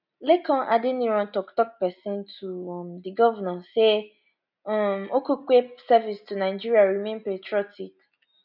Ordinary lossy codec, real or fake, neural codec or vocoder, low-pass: none; real; none; 5.4 kHz